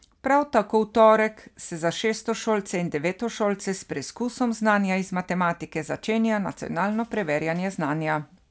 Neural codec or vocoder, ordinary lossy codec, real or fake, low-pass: none; none; real; none